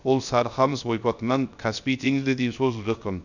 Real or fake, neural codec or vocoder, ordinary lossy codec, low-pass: fake; codec, 16 kHz, 0.3 kbps, FocalCodec; none; 7.2 kHz